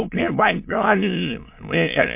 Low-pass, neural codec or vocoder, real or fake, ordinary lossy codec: 3.6 kHz; autoencoder, 22.05 kHz, a latent of 192 numbers a frame, VITS, trained on many speakers; fake; MP3, 32 kbps